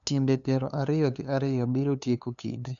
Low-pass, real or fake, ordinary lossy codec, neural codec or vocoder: 7.2 kHz; fake; none; codec, 16 kHz, 2 kbps, FunCodec, trained on LibriTTS, 25 frames a second